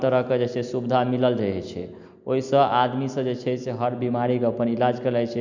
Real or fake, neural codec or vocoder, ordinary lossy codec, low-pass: real; none; none; 7.2 kHz